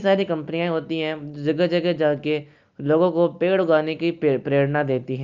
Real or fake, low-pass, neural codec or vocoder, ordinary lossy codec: real; 7.2 kHz; none; Opus, 24 kbps